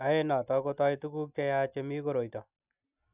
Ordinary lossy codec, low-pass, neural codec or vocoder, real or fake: none; 3.6 kHz; none; real